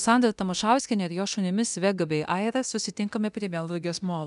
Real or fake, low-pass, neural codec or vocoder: fake; 10.8 kHz; codec, 24 kHz, 0.9 kbps, DualCodec